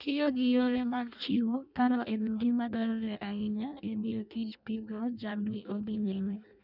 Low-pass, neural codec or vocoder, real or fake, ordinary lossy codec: 5.4 kHz; codec, 16 kHz in and 24 kHz out, 0.6 kbps, FireRedTTS-2 codec; fake; none